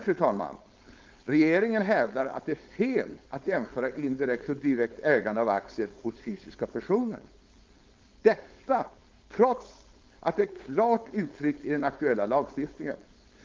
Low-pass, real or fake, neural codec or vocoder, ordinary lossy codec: 7.2 kHz; fake; codec, 16 kHz, 4.8 kbps, FACodec; Opus, 32 kbps